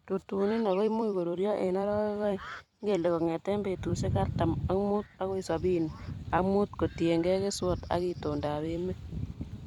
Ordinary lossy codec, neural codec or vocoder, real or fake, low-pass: none; vocoder, 44.1 kHz, 128 mel bands every 256 samples, BigVGAN v2; fake; 19.8 kHz